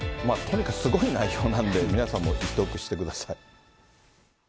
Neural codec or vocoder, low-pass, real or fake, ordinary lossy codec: none; none; real; none